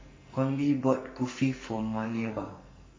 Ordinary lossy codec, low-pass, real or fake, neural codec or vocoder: MP3, 32 kbps; 7.2 kHz; fake; codec, 44.1 kHz, 2.6 kbps, SNAC